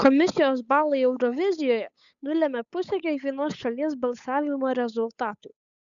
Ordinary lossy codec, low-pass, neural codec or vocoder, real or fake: MP3, 96 kbps; 7.2 kHz; codec, 16 kHz, 8 kbps, FunCodec, trained on Chinese and English, 25 frames a second; fake